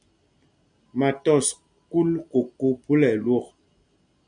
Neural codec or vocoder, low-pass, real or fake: none; 9.9 kHz; real